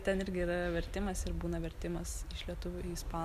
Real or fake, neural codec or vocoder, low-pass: real; none; 14.4 kHz